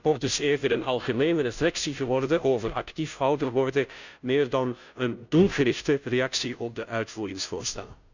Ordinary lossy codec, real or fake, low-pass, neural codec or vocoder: none; fake; 7.2 kHz; codec, 16 kHz, 0.5 kbps, FunCodec, trained on Chinese and English, 25 frames a second